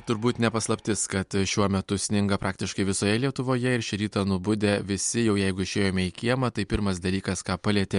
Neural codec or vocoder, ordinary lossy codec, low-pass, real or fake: none; MP3, 64 kbps; 10.8 kHz; real